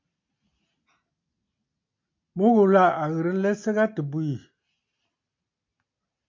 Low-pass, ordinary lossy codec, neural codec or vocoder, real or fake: 7.2 kHz; MP3, 64 kbps; none; real